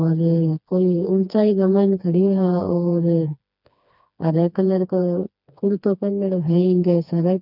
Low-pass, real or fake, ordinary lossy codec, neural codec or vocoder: 5.4 kHz; fake; none; codec, 16 kHz, 2 kbps, FreqCodec, smaller model